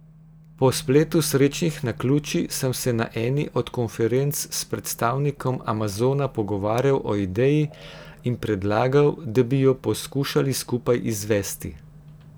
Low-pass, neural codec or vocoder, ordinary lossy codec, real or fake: none; none; none; real